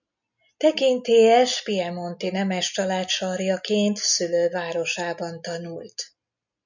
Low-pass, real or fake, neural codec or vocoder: 7.2 kHz; real; none